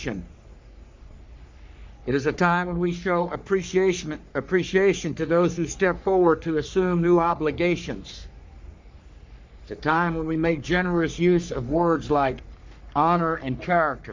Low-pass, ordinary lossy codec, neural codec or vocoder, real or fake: 7.2 kHz; MP3, 64 kbps; codec, 44.1 kHz, 3.4 kbps, Pupu-Codec; fake